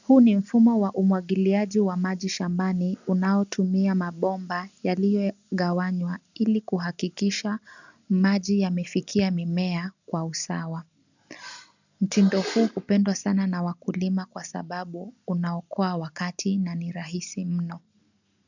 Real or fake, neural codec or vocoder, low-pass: real; none; 7.2 kHz